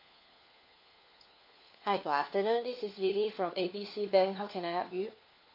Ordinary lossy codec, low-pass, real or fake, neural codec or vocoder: AAC, 32 kbps; 5.4 kHz; fake; codec, 16 kHz, 4 kbps, FunCodec, trained on LibriTTS, 50 frames a second